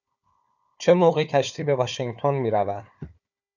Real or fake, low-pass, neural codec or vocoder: fake; 7.2 kHz; codec, 16 kHz, 4 kbps, FunCodec, trained on Chinese and English, 50 frames a second